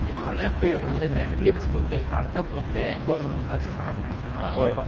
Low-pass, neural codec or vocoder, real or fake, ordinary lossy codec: 7.2 kHz; codec, 24 kHz, 1.5 kbps, HILCodec; fake; Opus, 24 kbps